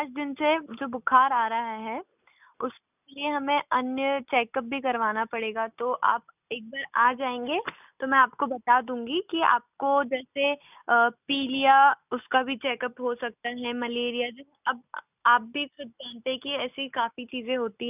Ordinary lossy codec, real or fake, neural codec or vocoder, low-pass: none; real; none; 3.6 kHz